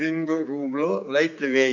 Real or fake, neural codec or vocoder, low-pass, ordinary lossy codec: fake; codec, 16 kHz, 4 kbps, X-Codec, HuBERT features, trained on general audio; 7.2 kHz; none